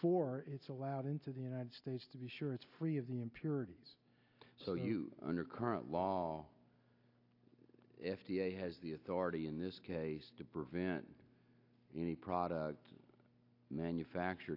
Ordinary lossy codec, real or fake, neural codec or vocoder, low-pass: AAC, 32 kbps; real; none; 5.4 kHz